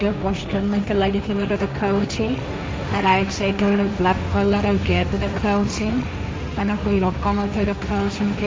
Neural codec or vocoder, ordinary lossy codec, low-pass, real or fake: codec, 16 kHz, 1.1 kbps, Voila-Tokenizer; none; none; fake